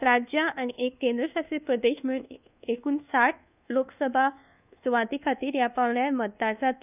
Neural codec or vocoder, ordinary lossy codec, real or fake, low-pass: codec, 24 kHz, 1.2 kbps, DualCodec; none; fake; 3.6 kHz